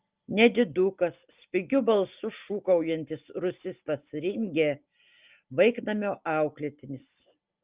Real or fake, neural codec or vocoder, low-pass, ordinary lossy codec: real; none; 3.6 kHz; Opus, 24 kbps